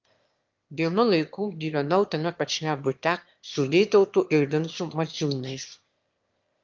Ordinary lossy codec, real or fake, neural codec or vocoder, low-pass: Opus, 24 kbps; fake; autoencoder, 22.05 kHz, a latent of 192 numbers a frame, VITS, trained on one speaker; 7.2 kHz